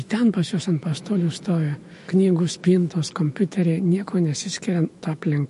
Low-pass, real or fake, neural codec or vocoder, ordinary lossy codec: 14.4 kHz; fake; autoencoder, 48 kHz, 128 numbers a frame, DAC-VAE, trained on Japanese speech; MP3, 48 kbps